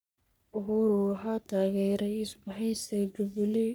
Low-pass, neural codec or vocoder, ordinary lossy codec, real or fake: none; codec, 44.1 kHz, 3.4 kbps, Pupu-Codec; none; fake